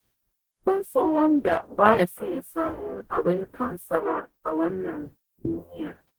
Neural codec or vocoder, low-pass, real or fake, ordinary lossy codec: codec, 44.1 kHz, 0.9 kbps, DAC; 19.8 kHz; fake; Opus, 16 kbps